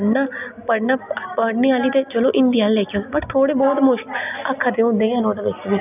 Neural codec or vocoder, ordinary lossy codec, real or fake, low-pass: none; none; real; 3.6 kHz